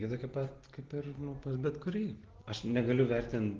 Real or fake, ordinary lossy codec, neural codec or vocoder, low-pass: real; Opus, 16 kbps; none; 7.2 kHz